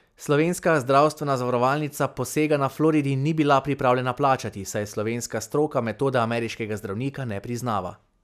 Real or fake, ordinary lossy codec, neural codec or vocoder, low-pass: real; none; none; 14.4 kHz